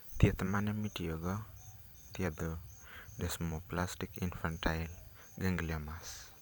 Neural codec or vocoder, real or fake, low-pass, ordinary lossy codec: vocoder, 44.1 kHz, 128 mel bands every 512 samples, BigVGAN v2; fake; none; none